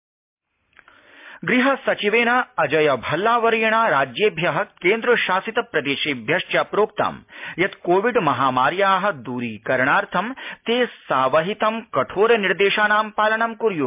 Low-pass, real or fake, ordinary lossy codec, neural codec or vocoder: 3.6 kHz; real; MP3, 32 kbps; none